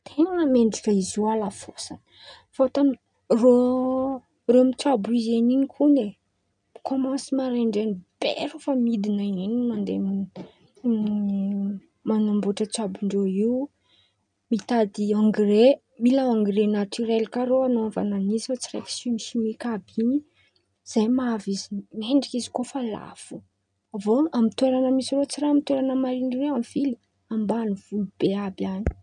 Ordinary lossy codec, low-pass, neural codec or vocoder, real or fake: none; 9.9 kHz; none; real